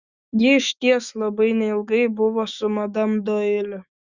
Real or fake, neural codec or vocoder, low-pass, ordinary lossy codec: fake; autoencoder, 48 kHz, 128 numbers a frame, DAC-VAE, trained on Japanese speech; 7.2 kHz; Opus, 64 kbps